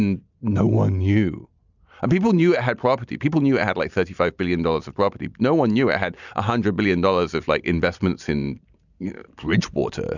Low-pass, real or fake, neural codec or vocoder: 7.2 kHz; real; none